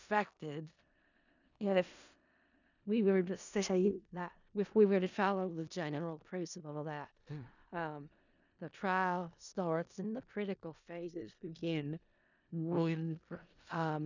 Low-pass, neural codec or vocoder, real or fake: 7.2 kHz; codec, 16 kHz in and 24 kHz out, 0.4 kbps, LongCat-Audio-Codec, four codebook decoder; fake